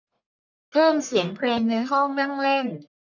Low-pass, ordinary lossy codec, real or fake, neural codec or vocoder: 7.2 kHz; none; fake; codec, 44.1 kHz, 1.7 kbps, Pupu-Codec